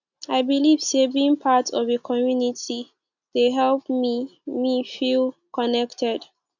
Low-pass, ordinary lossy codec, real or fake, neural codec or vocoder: 7.2 kHz; none; real; none